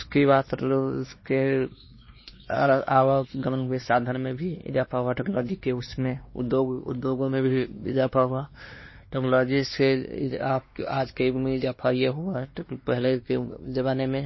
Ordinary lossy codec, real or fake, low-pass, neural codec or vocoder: MP3, 24 kbps; fake; 7.2 kHz; codec, 16 kHz, 2 kbps, X-Codec, WavLM features, trained on Multilingual LibriSpeech